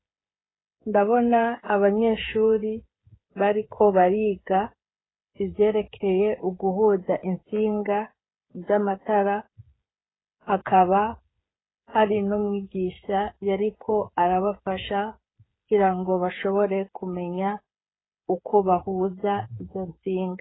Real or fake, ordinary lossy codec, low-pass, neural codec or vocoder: fake; AAC, 16 kbps; 7.2 kHz; codec, 16 kHz, 8 kbps, FreqCodec, smaller model